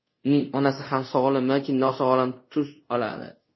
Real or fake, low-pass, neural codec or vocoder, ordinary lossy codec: fake; 7.2 kHz; codec, 24 kHz, 0.5 kbps, DualCodec; MP3, 24 kbps